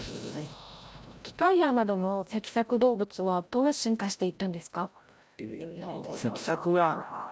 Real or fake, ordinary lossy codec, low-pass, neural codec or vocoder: fake; none; none; codec, 16 kHz, 0.5 kbps, FreqCodec, larger model